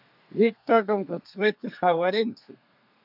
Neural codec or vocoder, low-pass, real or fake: codec, 32 kHz, 1.9 kbps, SNAC; 5.4 kHz; fake